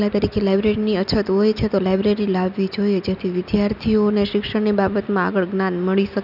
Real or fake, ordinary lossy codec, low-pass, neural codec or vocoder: real; none; 5.4 kHz; none